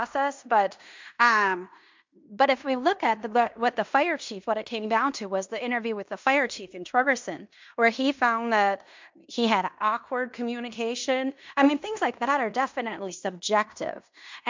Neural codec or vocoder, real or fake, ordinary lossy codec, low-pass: codec, 16 kHz in and 24 kHz out, 0.9 kbps, LongCat-Audio-Codec, fine tuned four codebook decoder; fake; MP3, 64 kbps; 7.2 kHz